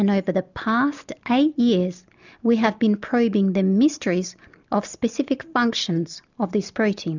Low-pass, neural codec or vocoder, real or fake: 7.2 kHz; none; real